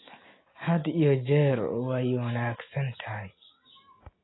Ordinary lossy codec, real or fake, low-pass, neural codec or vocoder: AAC, 16 kbps; real; 7.2 kHz; none